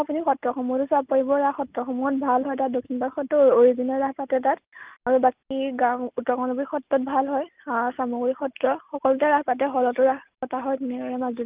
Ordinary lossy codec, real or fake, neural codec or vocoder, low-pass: Opus, 32 kbps; real; none; 3.6 kHz